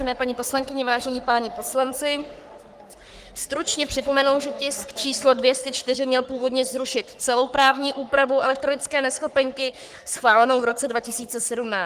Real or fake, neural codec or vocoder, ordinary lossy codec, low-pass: fake; codec, 44.1 kHz, 3.4 kbps, Pupu-Codec; Opus, 24 kbps; 14.4 kHz